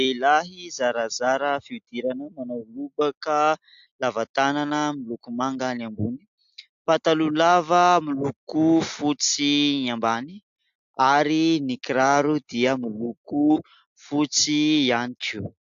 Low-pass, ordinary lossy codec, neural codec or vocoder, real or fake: 7.2 kHz; AAC, 64 kbps; none; real